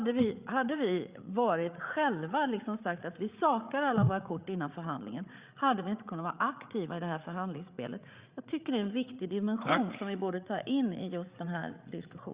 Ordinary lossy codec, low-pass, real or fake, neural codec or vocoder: Opus, 64 kbps; 3.6 kHz; fake; codec, 16 kHz, 8 kbps, FreqCodec, larger model